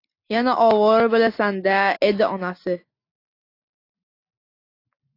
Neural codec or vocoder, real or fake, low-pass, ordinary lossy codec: none; real; 5.4 kHz; AAC, 32 kbps